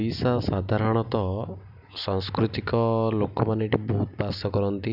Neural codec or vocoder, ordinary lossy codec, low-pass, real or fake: none; none; 5.4 kHz; real